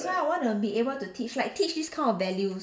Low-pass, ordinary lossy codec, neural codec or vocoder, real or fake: none; none; none; real